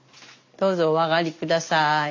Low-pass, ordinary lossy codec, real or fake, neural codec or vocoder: 7.2 kHz; MP3, 64 kbps; real; none